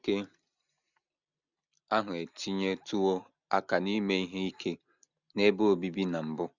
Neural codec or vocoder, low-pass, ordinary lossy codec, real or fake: none; 7.2 kHz; none; real